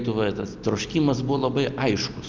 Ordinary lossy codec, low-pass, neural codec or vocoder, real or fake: Opus, 24 kbps; 7.2 kHz; none; real